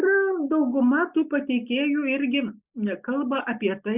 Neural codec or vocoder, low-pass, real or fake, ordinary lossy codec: none; 3.6 kHz; real; Opus, 64 kbps